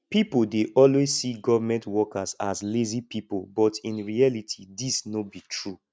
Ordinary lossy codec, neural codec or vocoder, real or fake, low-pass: none; none; real; none